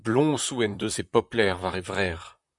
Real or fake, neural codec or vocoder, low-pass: fake; vocoder, 44.1 kHz, 128 mel bands, Pupu-Vocoder; 10.8 kHz